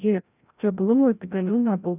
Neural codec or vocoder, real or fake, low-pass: codec, 16 kHz, 0.5 kbps, FreqCodec, larger model; fake; 3.6 kHz